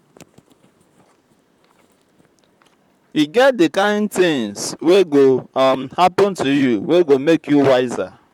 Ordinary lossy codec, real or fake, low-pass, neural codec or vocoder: none; fake; 19.8 kHz; vocoder, 44.1 kHz, 128 mel bands, Pupu-Vocoder